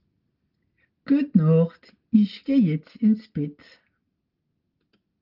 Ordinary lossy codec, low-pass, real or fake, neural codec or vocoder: Opus, 32 kbps; 5.4 kHz; real; none